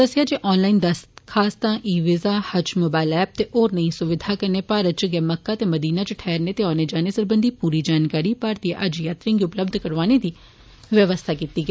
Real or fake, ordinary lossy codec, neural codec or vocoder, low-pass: real; none; none; none